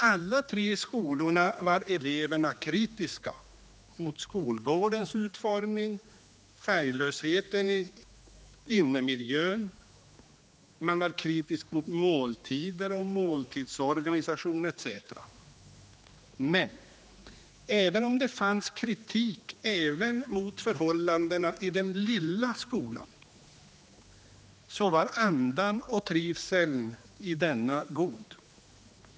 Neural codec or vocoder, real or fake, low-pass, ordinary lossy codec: codec, 16 kHz, 2 kbps, X-Codec, HuBERT features, trained on general audio; fake; none; none